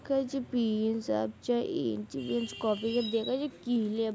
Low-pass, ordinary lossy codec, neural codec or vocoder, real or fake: none; none; none; real